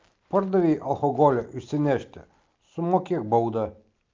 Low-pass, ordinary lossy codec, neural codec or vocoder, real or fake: 7.2 kHz; Opus, 32 kbps; none; real